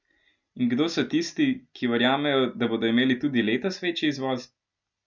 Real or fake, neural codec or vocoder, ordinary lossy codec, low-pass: real; none; none; 7.2 kHz